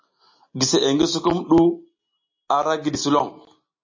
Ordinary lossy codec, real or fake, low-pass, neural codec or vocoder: MP3, 32 kbps; real; 7.2 kHz; none